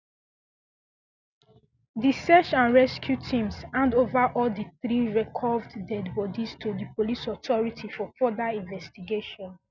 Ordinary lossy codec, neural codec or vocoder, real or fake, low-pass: none; none; real; 7.2 kHz